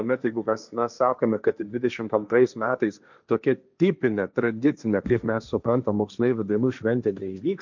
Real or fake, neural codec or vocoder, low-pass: fake; codec, 16 kHz, 1.1 kbps, Voila-Tokenizer; 7.2 kHz